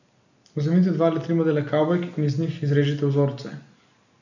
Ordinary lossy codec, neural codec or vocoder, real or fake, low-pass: none; none; real; 7.2 kHz